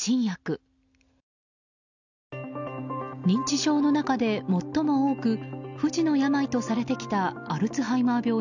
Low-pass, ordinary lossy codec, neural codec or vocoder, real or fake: 7.2 kHz; none; none; real